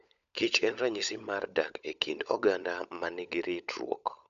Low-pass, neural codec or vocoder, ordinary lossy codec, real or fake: 7.2 kHz; codec, 16 kHz, 16 kbps, FunCodec, trained on LibriTTS, 50 frames a second; none; fake